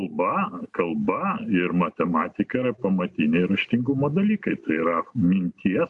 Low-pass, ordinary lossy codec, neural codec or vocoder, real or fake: 10.8 kHz; AAC, 64 kbps; none; real